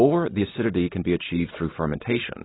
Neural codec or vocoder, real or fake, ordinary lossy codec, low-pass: none; real; AAC, 16 kbps; 7.2 kHz